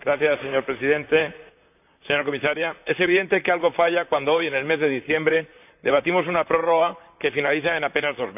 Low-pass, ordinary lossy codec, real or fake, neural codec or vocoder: 3.6 kHz; none; fake; vocoder, 44.1 kHz, 128 mel bands, Pupu-Vocoder